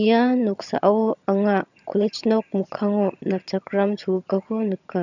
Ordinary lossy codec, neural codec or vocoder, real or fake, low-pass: none; vocoder, 22.05 kHz, 80 mel bands, HiFi-GAN; fake; 7.2 kHz